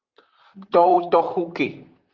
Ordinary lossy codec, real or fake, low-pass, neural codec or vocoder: Opus, 32 kbps; fake; 7.2 kHz; vocoder, 44.1 kHz, 128 mel bands, Pupu-Vocoder